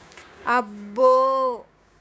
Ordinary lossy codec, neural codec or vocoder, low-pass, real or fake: none; codec, 16 kHz, 6 kbps, DAC; none; fake